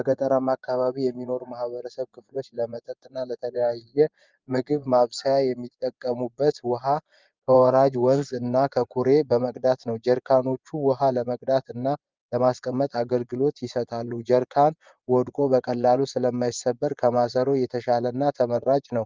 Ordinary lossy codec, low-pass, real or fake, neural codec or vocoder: Opus, 24 kbps; 7.2 kHz; fake; vocoder, 24 kHz, 100 mel bands, Vocos